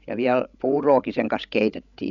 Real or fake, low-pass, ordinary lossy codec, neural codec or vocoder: fake; 7.2 kHz; none; codec, 16 kHz, 16 kbps, FreqCodec, larger model